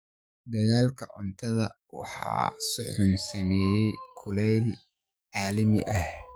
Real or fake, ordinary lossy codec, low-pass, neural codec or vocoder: fake; none; none; codec, 44.1 kHz, 7.8 kbps, DAC